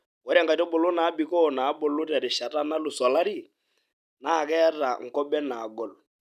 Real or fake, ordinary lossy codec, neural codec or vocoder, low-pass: real; none; none; 14.4 kHz